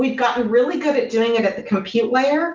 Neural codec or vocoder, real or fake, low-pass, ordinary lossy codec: none; real; 7.2 kHz; Opus, 24 kbps